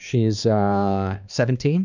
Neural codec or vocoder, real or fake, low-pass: codec, 16 kHz, 2 kbps, X-Codec, HuBERT features, trained on balanced general audio; fake; 7.2 kHz